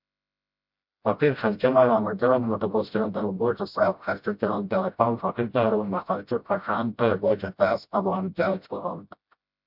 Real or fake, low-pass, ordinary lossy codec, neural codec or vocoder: fake; 5.4 kHz; MP3, 48 kbps; codec, 16 kHz, 0.5 kbps, FreqCodec, smaller model